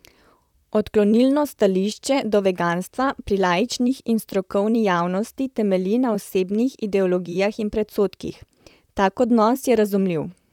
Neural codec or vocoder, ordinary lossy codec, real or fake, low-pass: vocoder, 44.1 kHz, 128 mel bands, Pupu-Vocoder; none; fake; 19.8 kHz